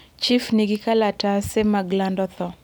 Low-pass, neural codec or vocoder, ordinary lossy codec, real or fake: none; none; none; real